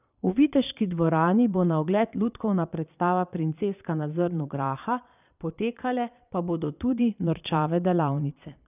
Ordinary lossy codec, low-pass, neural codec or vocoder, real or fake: none; 3.6 kHz; vocoder, 22.05 kHz, 80 mel bands, Vocos; fake